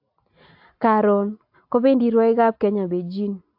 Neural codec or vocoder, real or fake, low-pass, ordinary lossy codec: none; real; 5.4 kHz; AAC, 48 kbps